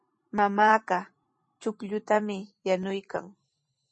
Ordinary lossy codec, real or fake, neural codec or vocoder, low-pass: MP3, 32 kbps; fake; vocoder, 44.1 kHz, 128 mel bands every 512 samples, BigVGAN v2; 10.8 kHz